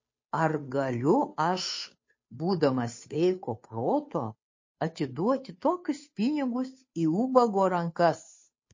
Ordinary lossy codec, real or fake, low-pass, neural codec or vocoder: MP3, 32 kbps; fake; 7.2 kHz; codec, 16 kHz, 2 kbps, FunCodec, trained on Chinese and English, 25 frames a second